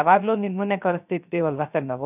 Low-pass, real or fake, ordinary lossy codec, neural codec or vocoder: 3.6 kHz; fake; none; codec, 16 kHz, 0.3 kbps, FocalCodec